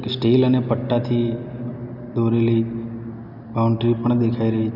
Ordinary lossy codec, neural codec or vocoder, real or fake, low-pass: none; none; real; 5.4 kHz